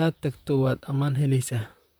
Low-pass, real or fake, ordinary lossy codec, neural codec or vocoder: none; fake; none; vocoder, 44.1 kHz, 128 mel bands, Pupu-Vocoder